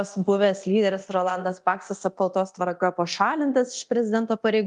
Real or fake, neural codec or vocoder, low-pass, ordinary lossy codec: fake; codec, 24 kHz, 0.9 kbps, DualCodec; 10.8 kHz; Opus, 32 kbps